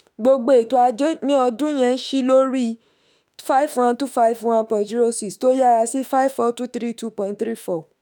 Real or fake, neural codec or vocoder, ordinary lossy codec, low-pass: fake; autoencoder, 48 kHz, 32 numbers a frame, DAC-VAE, trained on Japanese speech; none; none